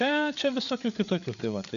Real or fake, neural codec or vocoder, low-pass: fake; codec, 16 kHz, 8 kbps, FreqCodec, larger model; 7.2 kHz